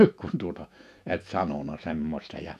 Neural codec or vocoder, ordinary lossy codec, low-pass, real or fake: autoencoder, 48 kHz, 128 numbers a frame, DAC-VAE, trained on Japanese speech; none; 14.4 kHz; fake